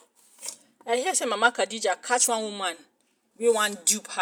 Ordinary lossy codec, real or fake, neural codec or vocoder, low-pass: none; real; none; none